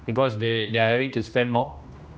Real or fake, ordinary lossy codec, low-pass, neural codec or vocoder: fake; none; none; codec, 16 kHz, 1 kbps, X-Codec, HuBERT features, trained on general audio